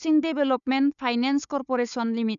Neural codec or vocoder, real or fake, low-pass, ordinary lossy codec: codec, 16 kHz, 4 kbps, FunCodec, trained on Chinese and English, 50 frames a second; fake; 7.2 kHz; none